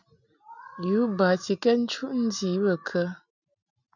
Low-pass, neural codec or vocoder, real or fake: 7.2 kHz; none; real